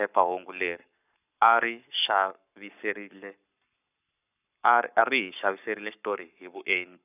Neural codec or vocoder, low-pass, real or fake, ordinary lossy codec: codec, 44.1 kHz, 7.8 kbps, Pupu-Codec; 3.6 kHz; fake; none